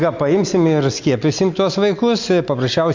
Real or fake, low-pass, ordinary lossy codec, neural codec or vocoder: real; 7.2 kHz; MP3, 64 kbps; none